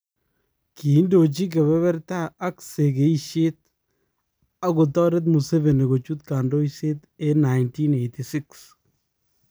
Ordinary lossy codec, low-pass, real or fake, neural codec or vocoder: none; none; real; none